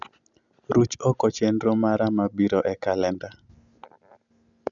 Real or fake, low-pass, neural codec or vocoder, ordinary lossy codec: real; 7.2 kHz; none; none